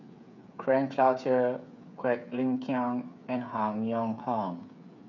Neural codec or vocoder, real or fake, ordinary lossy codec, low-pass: codec, 16 kHz, 8 kbps, FreqCodec, smaller model; fake; none; 7.2 kHz